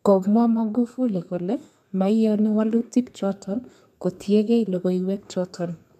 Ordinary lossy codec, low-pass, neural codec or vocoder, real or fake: MP3, 96 kbps; 14.4 kHz; codec, 32 kHz, 1.9 kbps, SNAC; fake